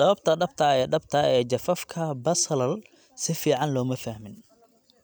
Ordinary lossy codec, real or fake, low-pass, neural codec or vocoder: none; real; none; none